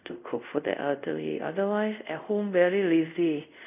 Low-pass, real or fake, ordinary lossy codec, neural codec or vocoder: 3.6 kHz; fake; none; codec, 24 kHz, 0.5 kbps, DualCodec